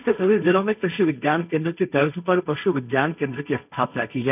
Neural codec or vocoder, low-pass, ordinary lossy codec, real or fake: codec, 16 kHz, 1.1 kbps, Voila-Tokenizer; 3.6 kHz; none; fake